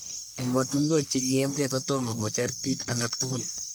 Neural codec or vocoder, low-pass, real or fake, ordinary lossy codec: codec, 44.1 kHz, 1.7 kbps, Pupu-Codec; none; fake; none